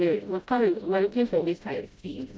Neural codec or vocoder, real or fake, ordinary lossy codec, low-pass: codec, 16 kHz, 0.5 kbps, FreqCodec, smaller model; fake; none; none